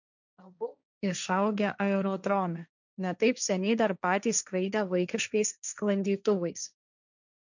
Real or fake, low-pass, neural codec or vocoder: fake; 7.2 kHz; codec, 16 kHz, 1.1 kbps, Voila-Tokenizer